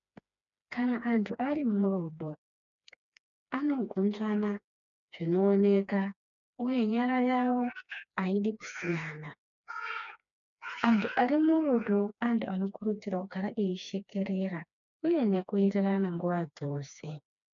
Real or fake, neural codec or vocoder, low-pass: fake; codec, 16 kHz, 2 kbps, FreqCodec, smaller model; 7.2 kHz